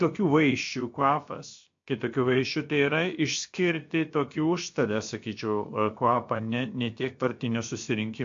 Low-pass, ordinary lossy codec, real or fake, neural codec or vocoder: 7.2 kHz; MP3, 48 kbps; fake; codec, 16 kHz, about 1 kbps, DyCAST, with the encoder's durations